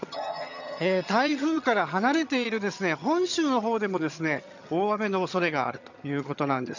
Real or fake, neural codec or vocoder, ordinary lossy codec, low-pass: fake; vocoder, 22.05 kHz, 80 mel bands, HiFi-GAN; none; 7.2 kHz